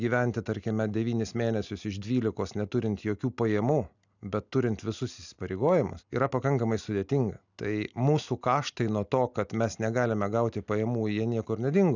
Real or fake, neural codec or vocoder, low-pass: real; none; 7.2 kHz